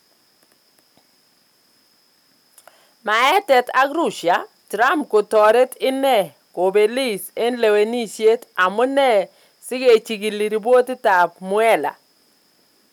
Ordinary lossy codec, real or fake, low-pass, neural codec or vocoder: none; real; 19.8 kHz; none